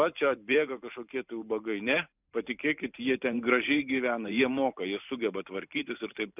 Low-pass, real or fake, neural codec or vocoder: 3.6 kHz; fake; vocoder, 44.1 kHz, 128 mel bands every 512 samples, BigVGAN v2